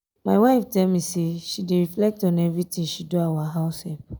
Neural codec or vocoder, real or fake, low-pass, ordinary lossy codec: none; real; none; none